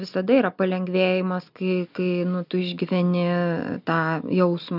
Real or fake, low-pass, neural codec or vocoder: real; 5.4 kHz; none